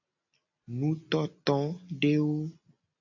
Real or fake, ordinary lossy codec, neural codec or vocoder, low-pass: real; AAC, 48 kbps; none; 7.2 kHz